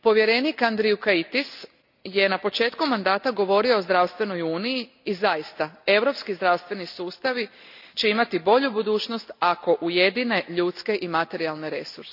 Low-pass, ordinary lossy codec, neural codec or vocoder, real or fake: 5.4 kHz; none; none; real